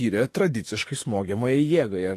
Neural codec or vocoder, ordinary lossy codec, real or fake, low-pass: autoencoder, 48 kHz, 32 numbers a frame, DAC-VAE, trained on Japanese speech; AAC, 48 kbps; fake; 14.4 kHz